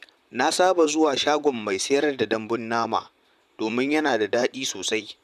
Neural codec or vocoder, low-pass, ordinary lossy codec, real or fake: vocoder, 44.1 kHz, 128 mel bands, Pupu-Vocoder; 14.4 kHz; none; fake